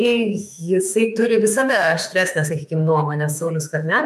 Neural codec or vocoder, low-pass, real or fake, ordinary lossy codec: autoencoder, 48 kHz, 32 numbers a frame, DAC-VAE, trained on Japanese speech; 14.4 kHz; fake; MP3, 96 kbps